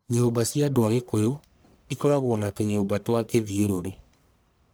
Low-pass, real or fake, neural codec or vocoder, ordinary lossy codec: none; fake; codec, 44.1 kHz, 1.7 kbps, Pupu-Codec; none